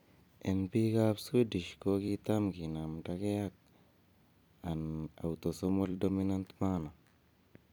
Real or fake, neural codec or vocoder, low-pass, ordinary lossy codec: fake; vocoder, 44.1 kHz, 128 mel bands every 256 samples, BigVGAN v2; none; none